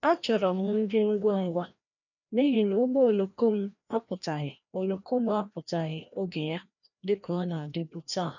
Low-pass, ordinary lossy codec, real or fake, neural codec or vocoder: 7.2 kHz; none; fake; codec, 16 kHz, 1 kbps, FreqCodec, larger model